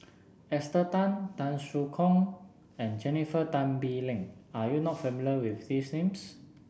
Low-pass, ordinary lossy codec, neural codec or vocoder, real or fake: none; none; none; real